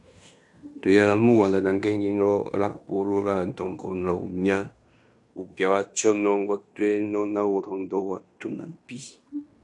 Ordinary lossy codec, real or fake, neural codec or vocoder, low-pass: MP3, 96 kbps; fake; codec, 16 kHz in and 24 kHz out, 0.9 kbps, LongCat-Audio-Codec, fine tuned four codebook decoder; 10.8 kHz